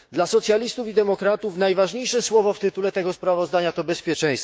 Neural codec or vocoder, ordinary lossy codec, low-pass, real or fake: codec, 16 kHz, 6 kbps, DAC; none; none; fake